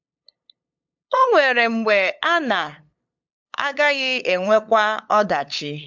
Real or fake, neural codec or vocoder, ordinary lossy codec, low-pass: fake; codec, 16 kHz, 8 kbps, FunCodec, trained on LibriTTS, 25 frames a second; MP3, 64 kbps; 7.2 kHz